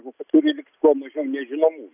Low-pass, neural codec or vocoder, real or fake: 3.6 kHz; none; real